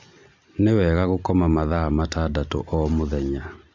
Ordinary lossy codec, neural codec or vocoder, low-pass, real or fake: MP3, 64 kbps; none; 7.2 kHz; real